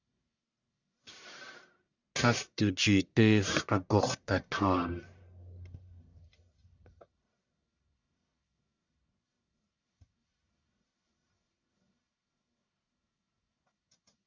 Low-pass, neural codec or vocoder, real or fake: 7.2 kHz; codec, 44.1 kHz, 1.7 kbps, Pupu-Codec; fake